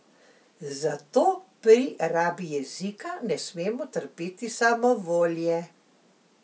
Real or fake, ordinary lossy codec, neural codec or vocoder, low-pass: real; none; none; none